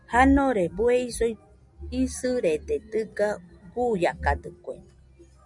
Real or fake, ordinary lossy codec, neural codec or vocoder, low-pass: real; MP3, 96 kbps; none; 10.8 kHz